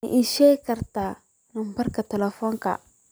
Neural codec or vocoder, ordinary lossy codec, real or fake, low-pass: none; none; real; none